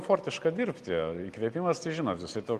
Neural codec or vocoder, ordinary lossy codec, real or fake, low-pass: none; Opus, 32 kbps; real; 14.4 kHz